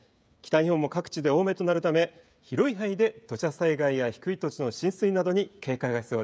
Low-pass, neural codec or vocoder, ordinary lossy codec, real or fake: none; codec, 16 kHz, 16 kbps, FreqCodec, smaller model; none; fake